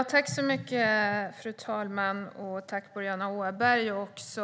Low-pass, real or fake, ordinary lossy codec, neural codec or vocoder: none; real; none; none